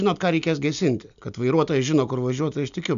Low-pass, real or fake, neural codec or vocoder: 7.2 kHz; real; none